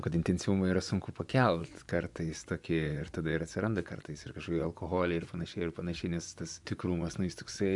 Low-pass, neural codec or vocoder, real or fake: 10.8 kHz; vocoder, 24 kHz, 100 mel bands, Vocos; fake